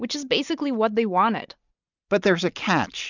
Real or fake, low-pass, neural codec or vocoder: real; 7.2 kHz; none